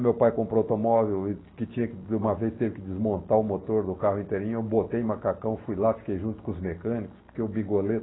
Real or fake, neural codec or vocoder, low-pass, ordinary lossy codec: real; none; 7.2 kHz; AAC, 16 kbps